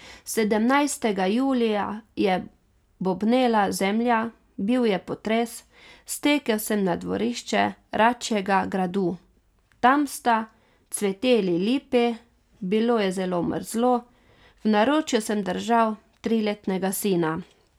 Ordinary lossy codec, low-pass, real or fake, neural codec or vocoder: none; 19.8 kHz; real; none